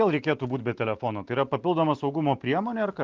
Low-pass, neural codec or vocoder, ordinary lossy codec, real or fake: 7.2 kHz; none; Opus, 32 kbps; real